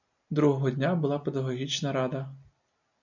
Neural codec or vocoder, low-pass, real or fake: none; 7.2 kHz; real